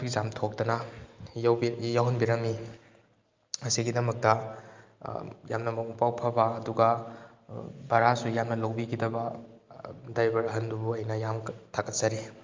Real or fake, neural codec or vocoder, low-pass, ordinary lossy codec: real; none; 7.2 kHz; Opus, 24 kbps